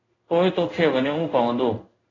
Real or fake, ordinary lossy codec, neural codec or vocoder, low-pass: fake; AAC, 48 kbps; codec, 16 kHz in and 24 kHz out, 1 kbps, XY-Tokenizer; 7.2 kHz